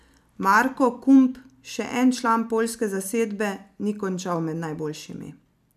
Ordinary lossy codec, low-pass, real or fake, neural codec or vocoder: none; 14.4 kHz; real; none